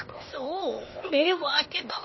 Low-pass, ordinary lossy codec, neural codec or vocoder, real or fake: 7.2 kHz; MP3, 24 kbps; codec, 16 kHz, 0.8 kbps, ZipCodec; fake